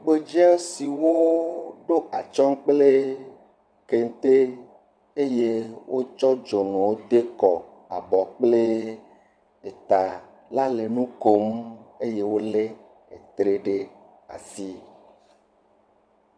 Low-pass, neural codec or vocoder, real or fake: 9.9 kHz; vocoder, 22.05 kHz, 80 mel bands, WaveNeXt; fake